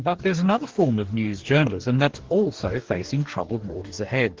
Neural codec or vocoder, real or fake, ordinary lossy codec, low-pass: codec, 44.1 kHz, 2.6 kbps, DAC; fake; Opus, 16 kbps; 7.2 kHz